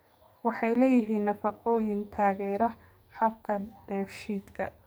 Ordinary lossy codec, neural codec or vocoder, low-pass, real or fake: none; codec, 44.1 kHz, 2.6 kbps, SNAC; none; fake